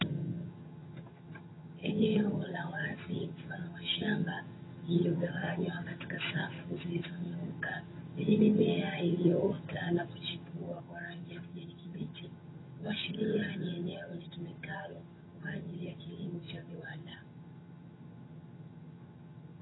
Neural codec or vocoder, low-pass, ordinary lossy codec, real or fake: vocoder, 22.05 kHz, 80 mel bands, HiFi-GAN; 7.2 kHz; AAC, 16 kbps; fake